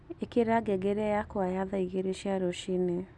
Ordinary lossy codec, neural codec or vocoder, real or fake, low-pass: none; none; real; none